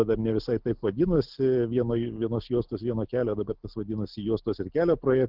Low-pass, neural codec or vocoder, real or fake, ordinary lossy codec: 5.4 kHz; none; real; Opus, 16 kbps